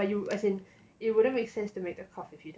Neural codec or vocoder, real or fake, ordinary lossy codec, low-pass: none; real; none; none